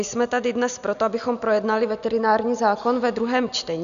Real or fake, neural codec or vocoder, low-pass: real; none; 7.2 kHz